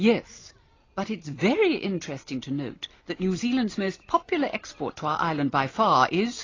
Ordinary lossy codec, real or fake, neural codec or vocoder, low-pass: AAC, 32 kbps; real; none; 7.2 kHz